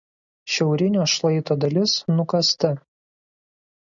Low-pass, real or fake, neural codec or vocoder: 7.2 kHz; real; none